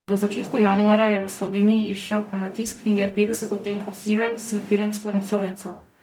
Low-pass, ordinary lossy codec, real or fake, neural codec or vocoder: 19.8 kHz; none; fake; codec, 44.1 kHz, 0.9 kbps, DAC